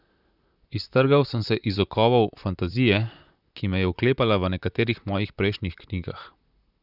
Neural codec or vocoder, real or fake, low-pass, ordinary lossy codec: vocoder, 44.1 kHz, 128 mel bands, Pupu-Vocoder; fake; 5.4 kHz; none